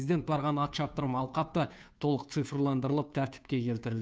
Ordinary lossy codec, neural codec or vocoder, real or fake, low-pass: none; codec, 16 kHz, 2 kbps, FunCodec, trained on Chinese and English, 25 frames a second; fake; none